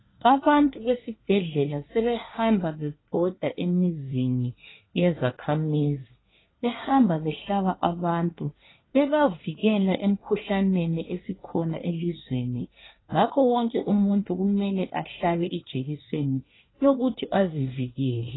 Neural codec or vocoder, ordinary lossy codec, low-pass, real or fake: codec, 24 kHz, 1 kbps, SNAC; AAC, 16 kbps; 7.2 kHz; fake